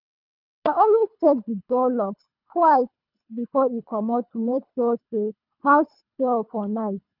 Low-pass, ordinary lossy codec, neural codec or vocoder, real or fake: 5.4 kHz; none; codec, 24 kHz, 3 kbps, HILCodec; fake